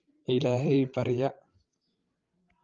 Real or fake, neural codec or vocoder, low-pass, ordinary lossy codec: fake; codec, 16 kHz, 16 kbps, FreqCodec, larger model; 7.2 kHz; Opus, 32 kbps